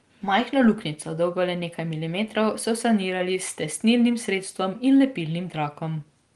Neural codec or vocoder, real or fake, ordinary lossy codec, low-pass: none; real; Opus, 24 kbps; 10.8 kHz